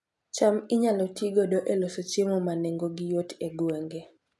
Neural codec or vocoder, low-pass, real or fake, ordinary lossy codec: none; none; real; none